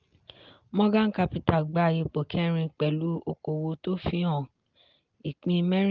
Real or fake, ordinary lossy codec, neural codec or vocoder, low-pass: real; Opus, 32 kbps; none; 7.2 kHz